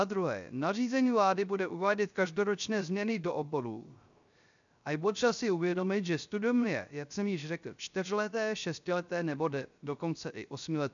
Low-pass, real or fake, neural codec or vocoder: 7.2 kHz; fake; codec, 16 kHz, 0.3 kbps, FocalCodec